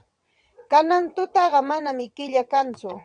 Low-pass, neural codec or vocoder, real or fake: 9.9 kHz; vocoder, 22.05 kHz, 80 mel bands, WaveNeXt; fake